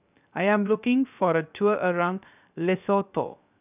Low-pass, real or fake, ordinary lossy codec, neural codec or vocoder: 3.6 kHz; fake; none; codec, 16 kHz, 0.7 kbps, FocalCodec